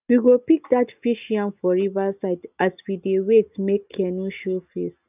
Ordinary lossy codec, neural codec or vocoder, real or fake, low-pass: none; none; real; 3.6 kHz